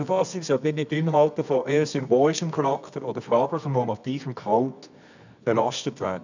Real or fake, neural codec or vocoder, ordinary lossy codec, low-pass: fake; codec, 24 kHz, 0.9 kbps, WavTokenizer, medium music audio release; none; 7.2 kHz